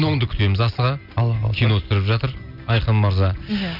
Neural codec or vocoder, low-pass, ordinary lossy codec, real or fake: none; 5.4 kHz; none; real